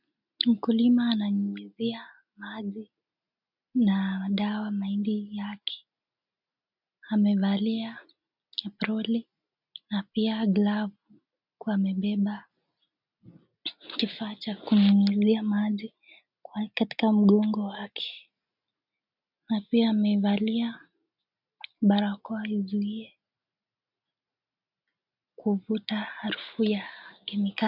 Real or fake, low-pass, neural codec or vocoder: real; 5.4 kHz; none